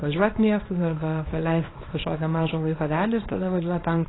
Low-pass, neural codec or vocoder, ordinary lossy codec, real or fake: 7.2 kHz; codec, 24 kHz, 0.9 kbps, WavTokenizer, small release; AAC, 16 kbps; fake